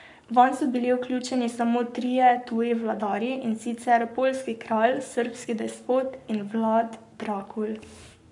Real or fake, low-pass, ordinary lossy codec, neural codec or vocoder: fake; 10.8 kHz; none; codec, 44.1 kHz, 7.8 kbps, Pupu-Codec